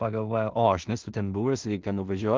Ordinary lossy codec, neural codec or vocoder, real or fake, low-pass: Opus, 32 kbps; codec, 16 kHz in and 24 kHz out, 0.4 kbps, LongCat-Audio-Codec, two codebook decoder; fake; 7.2 kHz